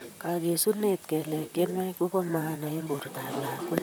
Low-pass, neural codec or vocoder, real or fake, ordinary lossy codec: none; vocoder, 44.1 kHz, 128 mel bands, Pupu-Vocoder; fake; none